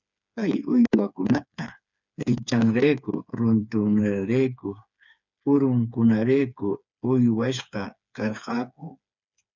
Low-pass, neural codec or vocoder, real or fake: 7.2 kHz; codec, 16 kHz, 4 kbps, FreqCodec, smaller model; fake